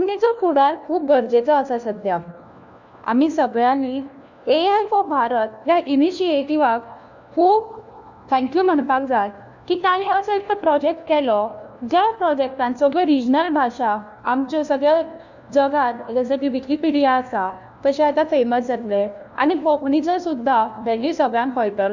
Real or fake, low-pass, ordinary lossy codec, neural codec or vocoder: fake; 7.2 kHz; none; codec, 16 kHz, 1 kbps, FunCodec, trained on LibriTTS, 50 frames a second